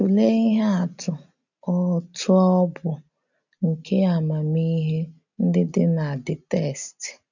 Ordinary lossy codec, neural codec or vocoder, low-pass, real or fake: none; none; 7.2 kHz; real